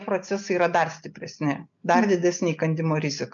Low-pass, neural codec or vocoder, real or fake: 7.2 kHz; none; real